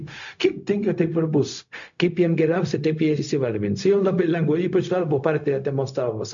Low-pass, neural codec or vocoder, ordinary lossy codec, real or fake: 7.2 kHz; codec, 16 kHz, 0.4 kbps, LongCat-Audio-Codec; MP3, 64 kbps; fake